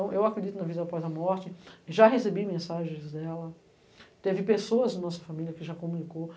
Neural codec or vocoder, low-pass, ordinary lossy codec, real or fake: none; none; none; real